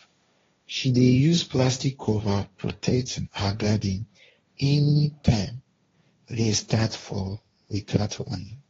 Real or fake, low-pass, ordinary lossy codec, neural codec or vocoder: fake; 7.2 kHz; AAC, 24 kbps; codec, 16 kHz, 1.1 kbps, Voila-Tokenizer